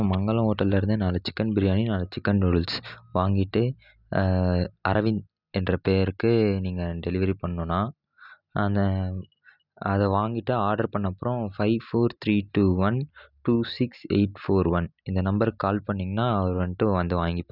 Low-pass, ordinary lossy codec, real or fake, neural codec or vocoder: 5.4 kHz; none; real; none